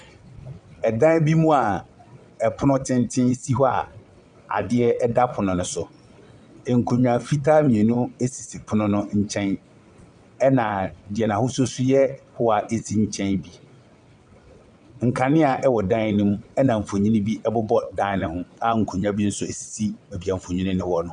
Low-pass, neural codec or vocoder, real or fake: 9.9 kHz; vocoder, 22.05 kHz, 80 mel bands, WaveNeXt; fake